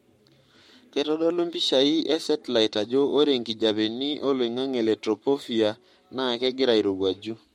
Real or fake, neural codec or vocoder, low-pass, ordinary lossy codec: fake; codec, 44.1 kHz, 7.8 kbps, Pupu-Codec; 19.8 kHz; MP3, 64 kbps